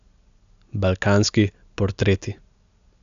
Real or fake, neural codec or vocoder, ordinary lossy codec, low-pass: real; none; AAC, 96 kbps; 7.2 kHz